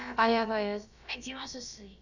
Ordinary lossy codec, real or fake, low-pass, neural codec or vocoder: none; fake; 7.2 kHz; codec, 16 kHz, about 1 kbps, DyCAST, with the encoder's durations